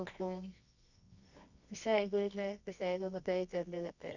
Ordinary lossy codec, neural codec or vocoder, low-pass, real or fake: none; codec, 24 kHz, 0.9 kbps, WavTokenizer, medium music audio release; 7.2 kHz; fake